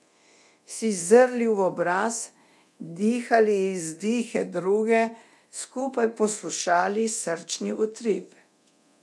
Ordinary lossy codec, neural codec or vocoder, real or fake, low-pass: none; codec, 24 kHz, 0.9 kbps, DualCodec; fake; none